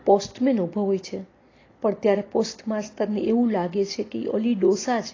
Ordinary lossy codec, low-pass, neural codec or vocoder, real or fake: AAC, 32 kbps; 7.2 kHz; none; real